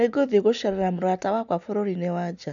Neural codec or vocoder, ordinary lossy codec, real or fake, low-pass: none; none; real; 7.2 kHz